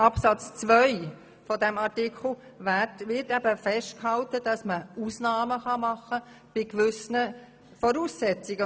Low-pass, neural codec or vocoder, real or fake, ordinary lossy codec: none; none; real; none